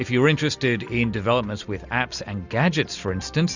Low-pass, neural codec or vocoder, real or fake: 7.2 kHz; none; real